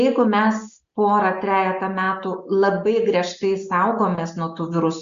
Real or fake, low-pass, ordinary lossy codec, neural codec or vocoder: real; 7.2 kHz; Opus, 64 kbps; none